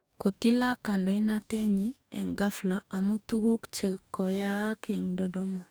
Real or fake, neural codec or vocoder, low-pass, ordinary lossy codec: fake; codec, 44.1 kHz, 2.6 kbps, DAC; none; none